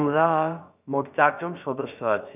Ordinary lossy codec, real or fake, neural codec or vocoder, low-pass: none; fake; codec, 16 kHz, about 1 kbps, DyCAST, with the encoder's durations; 3.6 kHz